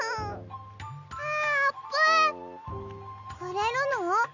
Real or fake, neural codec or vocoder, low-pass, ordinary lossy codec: real; none; 7.2 kHz; none